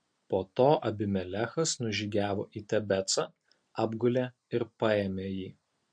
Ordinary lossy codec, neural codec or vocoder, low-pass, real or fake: MP3, 48 kbps; none; 9.9 kHz; real